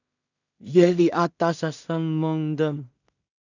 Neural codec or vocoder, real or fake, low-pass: codec, 16 kHz in and 24 kHz out, 0.4 kbps, LongCat-Audio-Codec, two codebook decoder; fake; 7.2 kHz